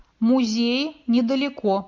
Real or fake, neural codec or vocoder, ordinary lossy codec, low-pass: real; none; MP3, 64 kbps; 7.2 kHz